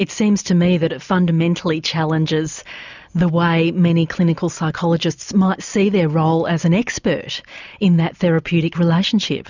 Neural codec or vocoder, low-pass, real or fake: none; 7.2 kHz; real